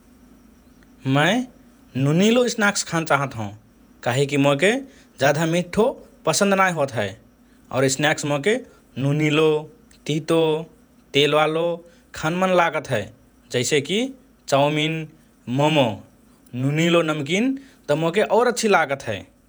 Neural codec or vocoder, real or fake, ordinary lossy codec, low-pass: vocoder, 48 kHz, 128 mel bands, Vocos; fake; none; none